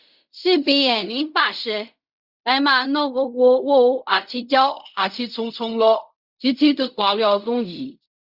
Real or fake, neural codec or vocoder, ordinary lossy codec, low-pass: fake; codec, 16 kHz in and 24 kHz out, 0.4 kbps, LongCat-Audio-Codec, fine tuned four codebook decoder; Opus, 64 kbps; 5.4 kHz